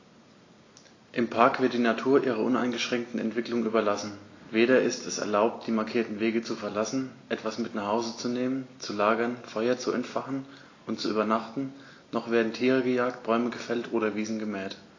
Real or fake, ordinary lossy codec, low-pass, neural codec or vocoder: real; AAC, 32 kbps; 7.2 kHz; none